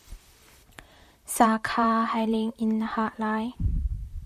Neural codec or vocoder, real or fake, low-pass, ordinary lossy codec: vocoder, 44.1 kHz, 128 mel bands, Pupu-Vocoder; fake; 14.4 kHz; MP3, 96 kbps